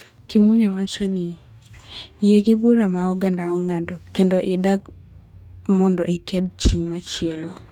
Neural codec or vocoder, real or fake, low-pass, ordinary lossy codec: codec, 44.1 kHz, 2.6 kbps, DAC; fake; 19.8 kHz; none